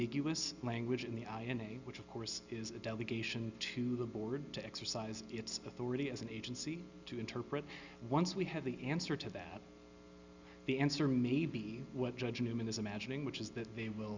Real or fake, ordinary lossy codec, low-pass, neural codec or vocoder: real; Opus, 64 kbps; 7.2 kHz; none